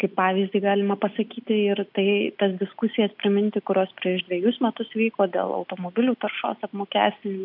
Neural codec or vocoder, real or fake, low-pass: none; real; 5.4 kHz